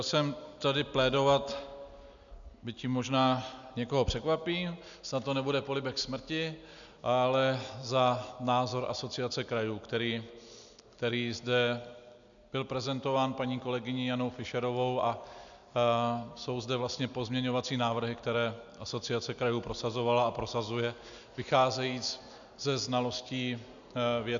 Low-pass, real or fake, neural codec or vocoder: 7.2 kHz; real; none